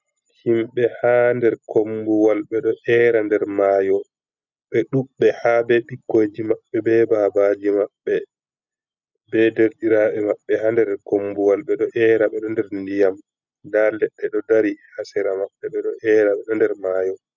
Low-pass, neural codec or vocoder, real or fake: 7.2 kHz; none; real